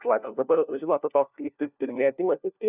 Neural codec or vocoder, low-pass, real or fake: codec, 16 kHz, 1 kbps, FunCodec, trained on LibriTTS, 50 frames a second; 3.6 kHz; fake